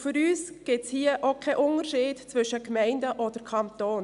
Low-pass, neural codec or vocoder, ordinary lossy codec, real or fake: 10.8 kHz; none; MP3, 96 kbps; real